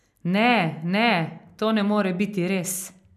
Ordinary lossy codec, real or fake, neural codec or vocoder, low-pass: none; real; none; 14.4 kHz